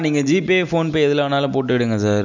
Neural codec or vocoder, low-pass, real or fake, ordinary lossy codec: none; 7.2 kHz; real; none